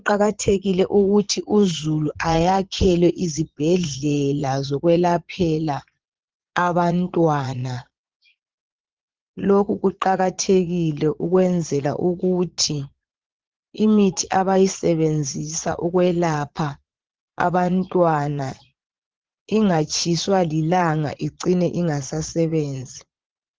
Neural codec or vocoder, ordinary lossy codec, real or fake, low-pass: vocoder, 22.05 kHz, 80 mel bands, Vocos; Opus, 16 kbps; fake; 7.2 kHz